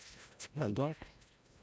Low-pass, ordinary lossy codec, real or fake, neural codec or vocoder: none; none; fake; codec, 16 kHz, 0.5 kbps, FreqCodec, larger model